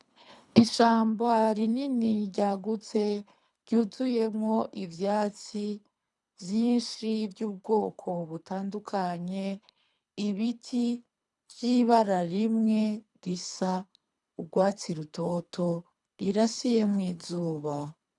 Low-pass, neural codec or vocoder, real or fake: 10.8 kHz; codec, 24 kHz, 3 kbps, HILCodec; fake